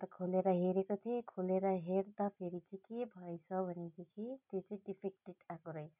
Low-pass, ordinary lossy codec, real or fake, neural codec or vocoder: 3.6 kHz; none; real; none